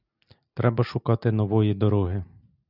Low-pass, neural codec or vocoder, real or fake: 5.4 kHz; none; real